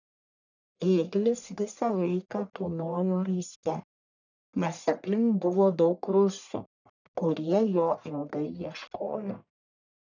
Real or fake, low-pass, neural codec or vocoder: fake; 7.2 kHz; codec, 44.1 kHz, 1.7 kbps, Pupu-Codec